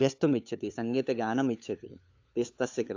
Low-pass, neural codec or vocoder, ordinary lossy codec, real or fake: 7.2 kHz; codec, 16 kHz, 8 kbps, FunCodec, trained on LibriTTS, 25 frames a second; AAC, 48 kbps; fake